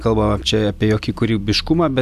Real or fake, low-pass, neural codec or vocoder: real; 14.4 kHz; none